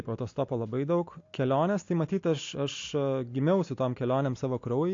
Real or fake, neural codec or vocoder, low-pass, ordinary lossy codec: real; none; 7.2 kHz; AAC, 48 kbps